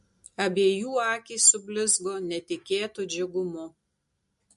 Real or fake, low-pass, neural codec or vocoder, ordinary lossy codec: real; 14.4 kHz; none; MP3, 48 kbps